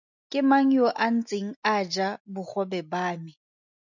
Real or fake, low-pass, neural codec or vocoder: real; 7.2 kHz; none